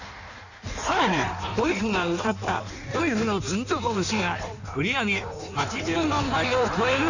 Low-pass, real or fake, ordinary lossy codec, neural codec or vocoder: 7.2 kHz; fake; none; codec, 16 kHz in and 24 kHz out, 1.1 kbps, FireRedTTS-2 codec